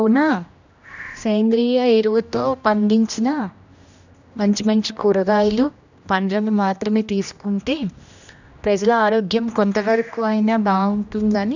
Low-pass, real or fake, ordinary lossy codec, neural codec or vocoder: 7.2 kHz; fake; none; codec, 16 kHz, 1 kbps, X-Codec, HuBERT features, trained on general audio